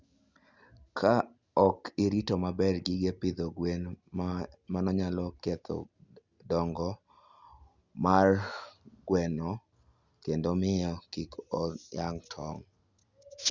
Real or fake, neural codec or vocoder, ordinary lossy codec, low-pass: real; none; Opus, 64 kbps; 7.2 kHz